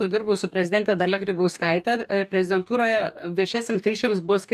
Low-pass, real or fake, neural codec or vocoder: 14.4 kHz; fake; codec, 44.1 kHz, 2.6 kbps, DAC